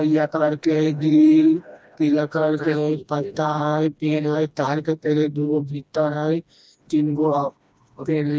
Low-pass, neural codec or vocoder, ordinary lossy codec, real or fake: none; codec, 16 kHz, 1 kbps, FreqCodec, smaller model; none; fake